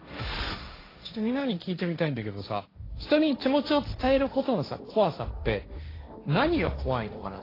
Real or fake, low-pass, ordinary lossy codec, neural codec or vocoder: fake; 5.4 kHz; AAC, 24 kbps; codec, 16 kHz, 1.1 kbps, Voila-Tokenizer